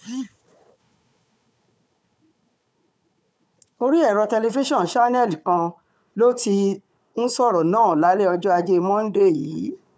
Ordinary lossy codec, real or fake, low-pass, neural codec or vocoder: none; fake; none; codec, 16 kHz, 4 kbps, FunCodec, trained on Chinese and English, 50 frames a second